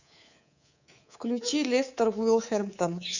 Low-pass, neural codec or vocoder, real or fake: 7.2 kHz; codec, 24 kHz, 3.1 kbps, DualCodec; fake